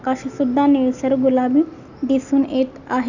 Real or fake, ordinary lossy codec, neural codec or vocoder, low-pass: real; none; none; 7.2 kHz